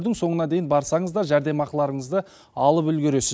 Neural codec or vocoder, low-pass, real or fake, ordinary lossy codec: none; none; real; none